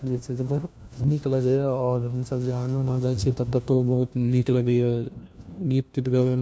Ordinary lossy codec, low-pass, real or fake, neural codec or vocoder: none; none; fake; codec, 16 kHz, 1 kbps, FunCodec, trained on LibriTTS, 50 frames a second